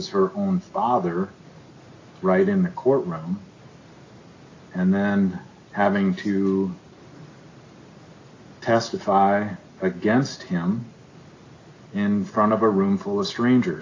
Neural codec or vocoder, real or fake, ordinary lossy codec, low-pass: none; real; AAC, 32 kbps; 7.2 kHz